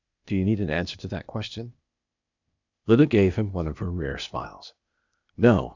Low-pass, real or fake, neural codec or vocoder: 7.2 kHz; fake; codec, 16 kHz, 0.8 kbps, ZipCodec